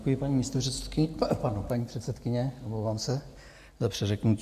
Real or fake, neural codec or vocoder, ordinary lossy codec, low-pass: real; none; AAC, 96 kbps; 14.4 kHz